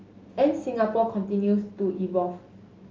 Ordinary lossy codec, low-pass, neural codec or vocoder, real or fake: Opus, 32 kbps; 7.2 kHz; none; real